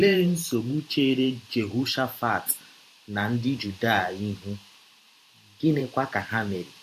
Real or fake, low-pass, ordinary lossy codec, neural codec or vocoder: fake; 14.4 kHz; AAC, 64 kbps; vocoder, 44.1 kHz, 128 mel bands every 512 samples, BigVGAN v2